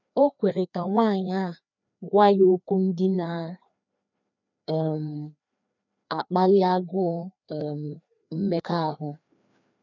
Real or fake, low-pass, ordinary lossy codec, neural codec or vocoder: fake; 7.2 kHz; none; codec, 16 kHz, 2 kbps, FreqCodec, larger model